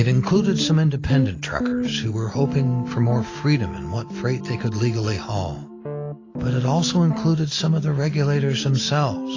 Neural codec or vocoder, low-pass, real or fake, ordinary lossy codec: none; 7.2 kHz; real; AAC, 32 kbps